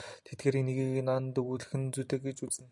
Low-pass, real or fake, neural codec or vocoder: 10.8 kHz; real; none